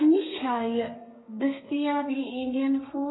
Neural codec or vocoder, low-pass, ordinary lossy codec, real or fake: codec, 32 kHz, 1.9 kbps, SNAC; 7.2 kHz; AAC, 16 kbps; fake